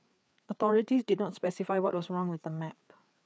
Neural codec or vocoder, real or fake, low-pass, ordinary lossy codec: codec, 16 kHz, 4 kbps, FreqCodec, larger model; fake; none; none